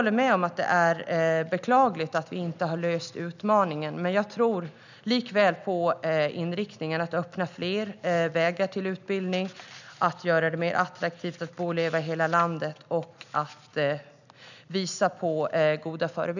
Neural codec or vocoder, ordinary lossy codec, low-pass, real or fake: none; none; 7.2 kHz; real